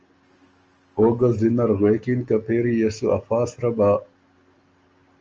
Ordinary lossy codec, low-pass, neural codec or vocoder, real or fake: Opus, 24 kbps; 7.2 kHz; none; real